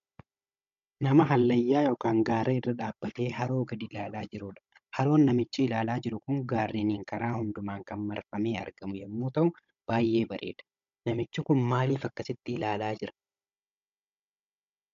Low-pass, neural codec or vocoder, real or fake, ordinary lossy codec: 7.2 kHz; codec, 16 kHz, 16 kbps, FunCodec, trained on Chinese and English, 50 frames a second; fake; AAC, 96 kbps